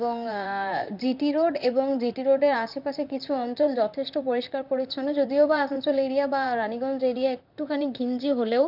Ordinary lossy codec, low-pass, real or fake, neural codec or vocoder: none; 5.4 kHz; fake; vocoder, 44.1 kHz, 128 mel bands, Pupu-Vocoder